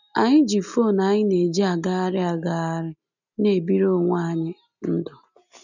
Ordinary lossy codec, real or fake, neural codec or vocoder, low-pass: none; real; none; 7.2 kHz